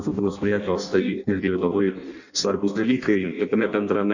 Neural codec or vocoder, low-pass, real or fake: codec, 16 kHz in and 24 kHz out, 0.6 kbps, FireRedTTS-2 codec; 7.2 kHz; fake